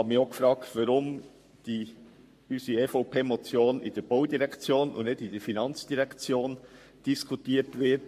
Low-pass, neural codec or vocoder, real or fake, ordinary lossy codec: 14.4 kHz; codec, 44.1 kHz, 7.8 kbps, Pupu-Codec; fake; MP3, 64 kbps